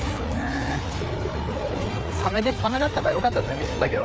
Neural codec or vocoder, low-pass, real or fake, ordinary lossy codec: codec, 16 kHz, 4 kbps, FreqCodec, larger model; none; fake; none